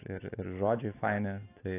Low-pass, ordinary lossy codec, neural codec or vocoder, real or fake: 3.6 kHz; AAC, 24 kbps; none; real